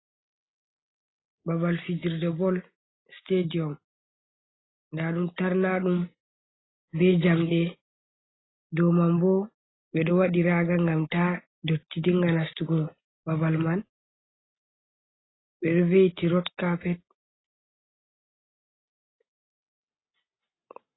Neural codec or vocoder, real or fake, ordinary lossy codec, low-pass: none; real; AAC, 16 kbps; 7.2 kHz